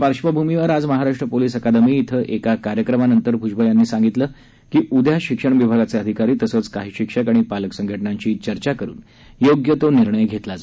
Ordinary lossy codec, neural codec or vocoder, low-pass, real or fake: none; none; none; real